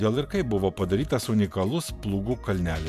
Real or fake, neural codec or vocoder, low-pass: fake; vocoder, 48 kHz, 128 mel bands, Vocos; 14.4 kHz